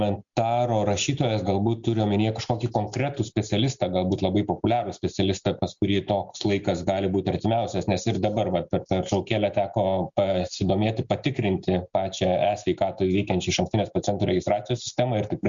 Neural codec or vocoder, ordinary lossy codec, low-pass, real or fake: none; Opus, 64 kbps; 7.2 kHz; real